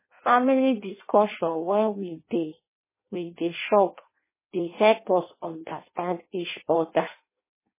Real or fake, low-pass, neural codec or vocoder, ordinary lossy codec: fake; 3.6 kHz; codec, 16 kHz in and 24 kHz out, 0.6 kbps, FireRedTTS-2 codec; MP3, 16 kbps